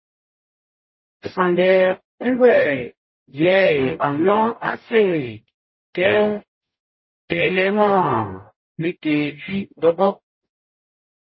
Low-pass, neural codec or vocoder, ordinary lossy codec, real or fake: 7.2 kHz; codec, 44.1 kHz, 0.9 kbps, DAC; MP3, 24 kbps; fake